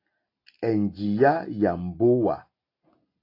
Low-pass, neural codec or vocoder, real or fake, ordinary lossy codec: 5.4 kHz; none; real; AAC, 32 kbps